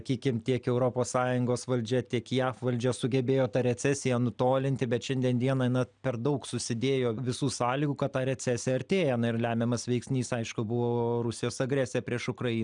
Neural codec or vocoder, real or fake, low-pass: none; real; 9.9 kHz